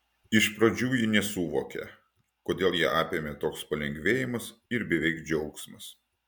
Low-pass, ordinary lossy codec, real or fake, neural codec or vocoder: 19.8 kHz; MP3, 96 kbps; real; none